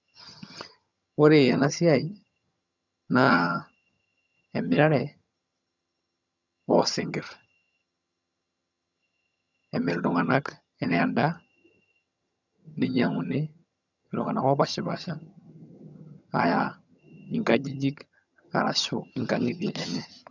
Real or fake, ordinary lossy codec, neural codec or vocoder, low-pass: fake; none; vocoder, 22.05 kHz, 80 mel bands, HiFi-GAN; 7.2 kHz